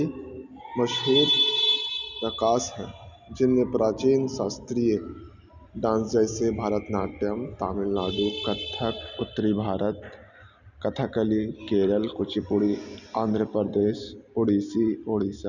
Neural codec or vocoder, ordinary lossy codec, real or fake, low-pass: none; none; real; 7.2 kHz